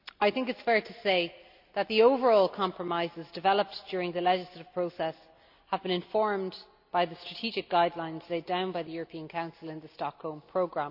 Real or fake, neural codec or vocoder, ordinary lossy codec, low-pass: real; none; AAC, 48 kbps; 5.4 kHz